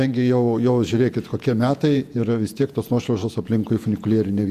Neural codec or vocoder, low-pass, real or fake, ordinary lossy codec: vocoder, 48 kHz, 128 mel bands, Vocos; 14.4 kHz; fake; Opus, 64 kbps